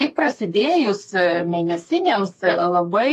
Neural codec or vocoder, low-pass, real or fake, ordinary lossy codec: codec, 32 kHz, 1.9 kbps, SNAC; 14.4 kHz; fake; AAC, 48 kbps